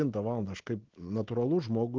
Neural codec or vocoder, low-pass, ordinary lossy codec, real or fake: none; 7.2 kHz; Opus, 16 kbps; real